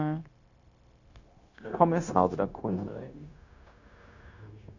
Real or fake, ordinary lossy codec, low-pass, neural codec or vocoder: fake; none; 7.2 kHz; codec, 16 kHz, 0.9 kbps, LongCat-Audio-Codec